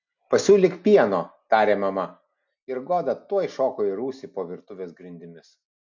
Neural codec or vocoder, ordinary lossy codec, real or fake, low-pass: none; MP3, 64 kbps; real; 7.2 kHz